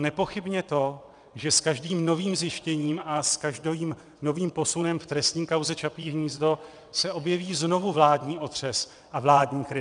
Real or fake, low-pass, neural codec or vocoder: fake; 9.9 kHz; vocoder, 22.05 kHz, 80 mel bands, WaveNeXt